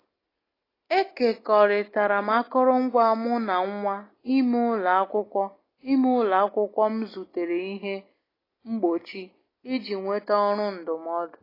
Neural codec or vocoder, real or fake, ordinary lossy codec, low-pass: none; real; AAC, 24 kbps; 5.4 kHz